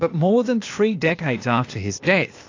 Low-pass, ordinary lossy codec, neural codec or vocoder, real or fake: 7.2 kHz; AAC, 48 kbps; codec, 16 kHz, 0.8 kbps, ZipCodec; fake